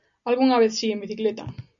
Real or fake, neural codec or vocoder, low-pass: real; none; 7.2 kHz